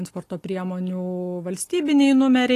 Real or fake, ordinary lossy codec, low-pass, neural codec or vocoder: real; AAC, 48 kbps; 14.4 kHz; none